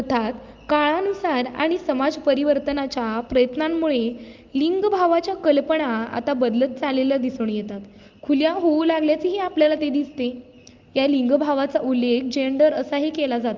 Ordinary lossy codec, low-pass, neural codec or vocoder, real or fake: Opus, 32 kbps; 7.2 kHz; none; real